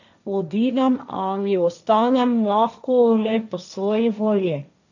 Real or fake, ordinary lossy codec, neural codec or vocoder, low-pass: fake; none; codec, 16 kHz, 1.1 kbps, Voila-Tokenizer; 7.2 kHz